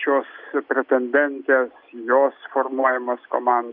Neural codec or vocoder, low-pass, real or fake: none; 5.4 kHz; real